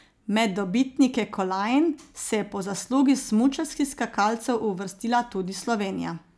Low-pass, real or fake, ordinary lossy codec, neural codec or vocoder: none; real; none; none